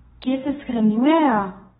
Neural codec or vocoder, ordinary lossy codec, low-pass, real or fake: codec, 32 kHz, 1.9 kbps, SNAC; AAC, 16 kbps; 14.4 kHz; fake